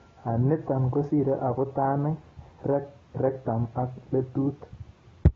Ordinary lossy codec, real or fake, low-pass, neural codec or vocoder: AAC, 24 kbps; real; 7.2 kHz; none